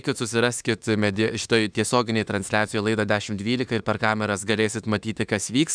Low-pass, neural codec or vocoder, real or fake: 9.9 kHz; autoencoder, 48 kHz, 32 numbers a frame, DAC-VAE, trained on Japanese speech; fake